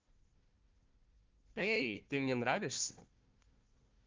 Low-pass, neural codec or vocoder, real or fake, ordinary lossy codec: 7.2 kHz; codec, 16 kHz, 1 kbps, FunCodec, trained on Chinese and English, 50 frames a second; fake; Opus, 32 kbps